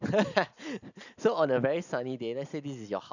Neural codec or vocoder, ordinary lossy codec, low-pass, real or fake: none; none; 7.2 kHz; real